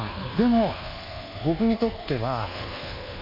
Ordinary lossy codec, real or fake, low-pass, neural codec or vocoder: MP3, 32 kbps; fake; 5.4 kHz; codec, 24 kHz, 1.2 kbps, DualCodec